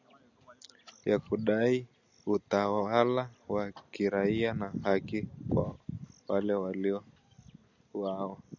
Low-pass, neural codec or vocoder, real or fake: 7.2 kHz; none; real